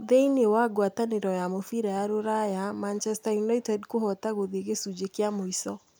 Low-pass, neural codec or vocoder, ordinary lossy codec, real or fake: none; none; none; real